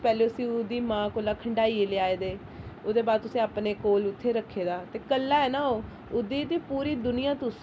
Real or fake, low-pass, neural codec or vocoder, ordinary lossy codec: real; none; none; none